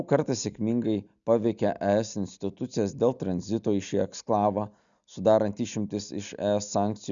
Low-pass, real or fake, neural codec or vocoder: 7.2 kHz; real; none